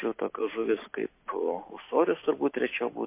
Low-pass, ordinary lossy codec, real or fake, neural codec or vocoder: 3.6 kHz; MP3, 24 kbps; real; none